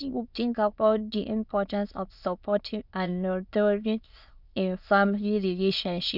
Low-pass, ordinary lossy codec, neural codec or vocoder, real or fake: 5.4 kHz; Opus, 64 kbps; autoencoder, 22.05 kHz, a latent of 192 numbers a frame, VITS, trained on many speakers; fake